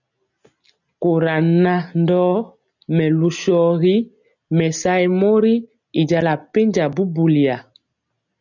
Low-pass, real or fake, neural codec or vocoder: 7.2 kHz; real; none